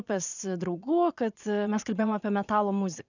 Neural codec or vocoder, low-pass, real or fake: vocoder, 22.05 kHz, 80 mel bands, Vocos; 7.2 kHz; fake